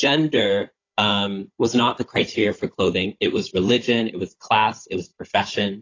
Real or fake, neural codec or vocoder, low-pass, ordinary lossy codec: fake; codec, 16 kHz, 16 kbps, FunCodec, trained on Chinese and English, 50 frames a second; 7.2 kHz; AAC, 32 kbps